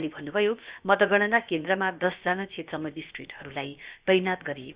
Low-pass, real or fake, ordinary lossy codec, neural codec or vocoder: 3.6 kHz; fake; Opus, 64 kbps; codec, 16 kHz, about 1 kbps, DyCAST, with the encoder's durations